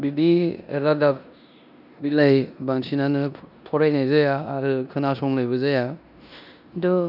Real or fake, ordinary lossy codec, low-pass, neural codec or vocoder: fake; none; 5.4 kHz; codec, 16 kHz in and 24 kHz out, 0.9 kbps, LongCat-Audio-Codec, four codebook decoder